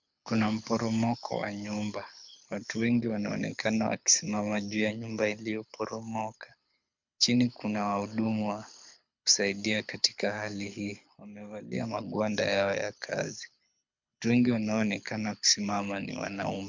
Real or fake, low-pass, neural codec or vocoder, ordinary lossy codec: fake; 7.2 kHz; codec, 24 kHz, 6 kbps, HILCodec; MP3, 64 kbps